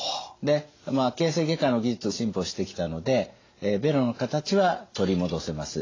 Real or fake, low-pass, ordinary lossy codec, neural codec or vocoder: real; 7.2 kHz; AAC, 32 kbps; none